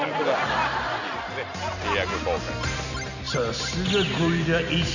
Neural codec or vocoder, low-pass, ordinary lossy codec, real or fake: none; 7.2 kHz; none; real